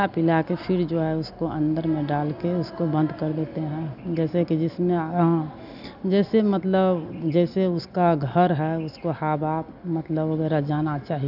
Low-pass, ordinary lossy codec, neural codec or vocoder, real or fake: 5.4 kHz; none; none; real